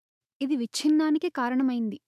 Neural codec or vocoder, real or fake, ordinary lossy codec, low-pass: autoencoder, 48 kHz, 128 numbers a frame, DAC-VAE, trained on Japanese speech; fake; none; 14.4 kHz